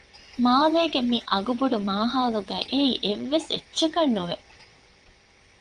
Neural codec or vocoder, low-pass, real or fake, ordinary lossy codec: vocoder, 22.05 kHz, 80 mel bands, WaveNeXt; 9.9 kHz; fake; Opus, 32 kbps